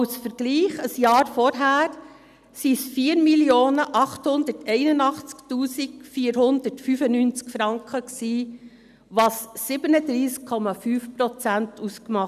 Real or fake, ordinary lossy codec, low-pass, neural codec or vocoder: real; none; 14.4 kHz; none